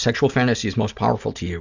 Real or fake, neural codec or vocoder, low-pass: real; none; 7.2 kHz